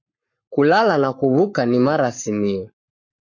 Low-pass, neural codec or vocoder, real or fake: 7.2 kHz; codec, 44.1 kHz, 7.8 kbps, Pupu-Codec; fake